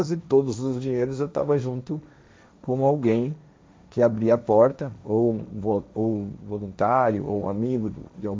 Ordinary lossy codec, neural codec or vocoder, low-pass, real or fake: none; codec, 16 kHz, 1.1 kbps, Voila-Tokenizer; none; fake